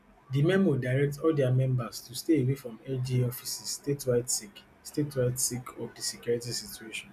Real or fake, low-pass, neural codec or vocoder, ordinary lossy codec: real; 14.4 kHz; none; none